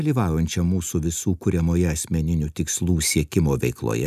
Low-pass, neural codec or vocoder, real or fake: 14.4 kHz; none; real